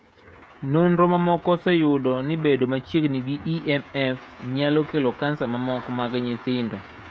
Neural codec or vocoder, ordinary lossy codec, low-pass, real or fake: codec, 16 kHz, 16 kbps, FreqCodec, smaller model; none; none; fake